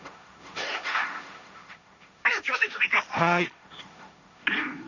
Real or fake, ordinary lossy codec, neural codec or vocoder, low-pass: fake; none; codec, 16 kHz, 1.1 kbps, Voila-Tokenizer; 7.2 kHz